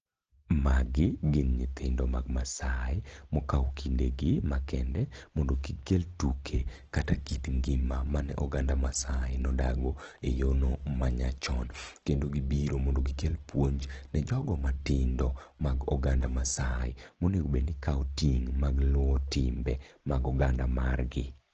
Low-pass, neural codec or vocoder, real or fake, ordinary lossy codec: 7.2 kHz; none; real; Opus, 16 kbps